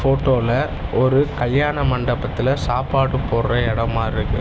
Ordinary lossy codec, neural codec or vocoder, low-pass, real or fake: none; none; none; real